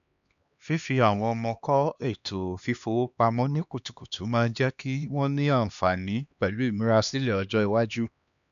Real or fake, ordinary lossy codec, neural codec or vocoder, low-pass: fake; none; codec, 16 kHz, 2 kbps, X-Codec, HuBERT features, trained on LibriSpeech; 7.2 kHz